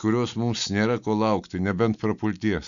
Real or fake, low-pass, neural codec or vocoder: real; 7.2 kHz; none